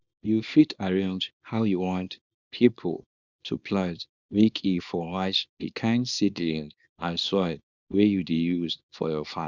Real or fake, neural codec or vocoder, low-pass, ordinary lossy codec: fake; codec, 24 kHz, 0.9 kbps, WavTokenizer, small release; 7.2 kHz; Opus, 64 kbps